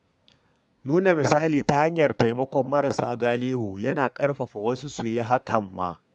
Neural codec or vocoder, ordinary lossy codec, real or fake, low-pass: codec, 24 kHz, 1 kbps, SNAC; none; fake; 10.8 kHz